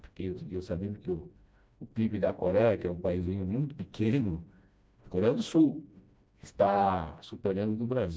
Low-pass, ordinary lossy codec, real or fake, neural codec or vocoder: none; none; fake; codec, 16 kHz, 1 kbps, FreqCodec, smaller model